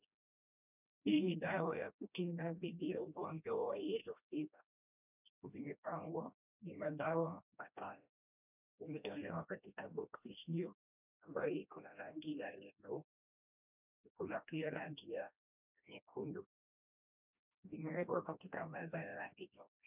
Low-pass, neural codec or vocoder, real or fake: 3.6 kHz; codec, 16 kHz, 1 kbps, FreqCodec, smaller model; fake